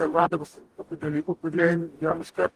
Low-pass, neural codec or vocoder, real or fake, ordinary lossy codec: 14.4 kHz; codec, 44.1 kHz, 0.9 kbps, DAC; fake; Opus, 24 kbps